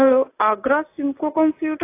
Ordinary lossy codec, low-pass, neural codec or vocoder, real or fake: AAC, 24 kbps; 3.6 kHz; none; real